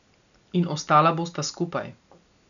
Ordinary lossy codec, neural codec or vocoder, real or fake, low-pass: none; none; real; 7.2 kHz